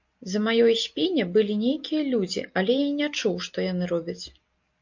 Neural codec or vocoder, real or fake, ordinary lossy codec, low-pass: none; real; MP3, 48 kbps; 7.2 kHz